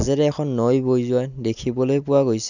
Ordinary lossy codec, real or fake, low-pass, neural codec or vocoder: none; real; 7.2 kHz; none